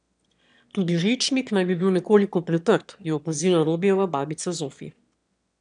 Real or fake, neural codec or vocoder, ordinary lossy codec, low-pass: fake; autoencoder, 22.05 kHz, a latent of 192 numbers a frame, VITS, trained on one speaker; none; 9.9 kHz